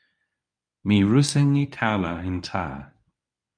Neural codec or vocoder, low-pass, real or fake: codec, 24 kHz, 0.9 kbps, WavTokenizer, medium speech release version 1; 9.9 kHz; fake